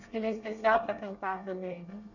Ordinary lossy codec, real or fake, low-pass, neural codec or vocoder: none; fake; 7.2 kHz; codec, 24 kHz, 1 kbps, SNAC